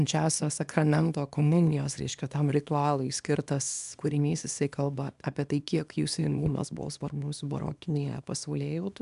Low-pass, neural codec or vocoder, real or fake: 10.8 kHz; codec, 24 kHz, 0.9 kbps, WavTokenizer, small release; fake